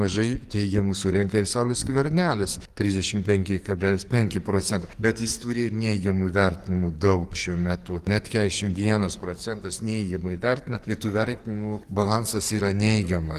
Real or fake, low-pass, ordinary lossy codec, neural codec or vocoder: fake; 14.4 kHz; Opus, 24 kbps; codec, 44.1 kHz, 2.6 kbps, SNAC